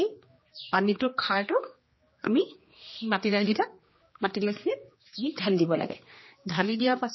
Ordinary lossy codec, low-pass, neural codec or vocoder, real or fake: MP3, 24 kbps; 7.2 kHz; codec, 16 kHz, 2 kbps, X-Codec, HuBERT features, trained on general audio; fake